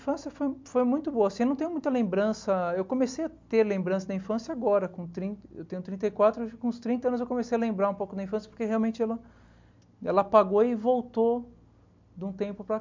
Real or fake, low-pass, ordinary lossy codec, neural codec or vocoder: real; 7.2 kHz; MP3, 64 kbps; none